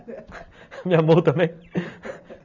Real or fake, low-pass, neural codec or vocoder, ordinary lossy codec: real; 7.2 kHz; none; none